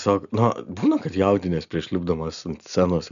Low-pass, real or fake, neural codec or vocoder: 7.2 kHz; real; none